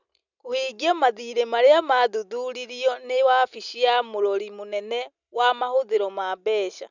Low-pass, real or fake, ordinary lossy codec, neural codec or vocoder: 7.2 kHz; real; none; none